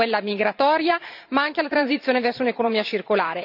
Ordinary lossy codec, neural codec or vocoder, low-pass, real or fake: none; vocoder, 44.1 kHz, 128 mel bands every 512 samples, BigVGAN v2; 5.4 kHz; fake